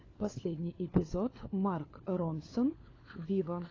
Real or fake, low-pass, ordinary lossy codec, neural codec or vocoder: fake; 7.2 kHz; AAC, 32 kbps; codec, 16 kHz, 4 kbps, FunCodec, trained on LibriTTS, 50 frames a second